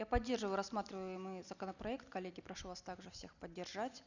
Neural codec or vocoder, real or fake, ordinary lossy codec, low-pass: none; real; none; 7.2 kHz